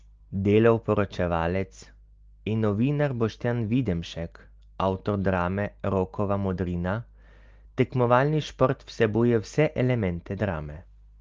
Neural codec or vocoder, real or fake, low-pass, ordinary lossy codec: none; real; 7.2 kHz; Opus, 32 kbps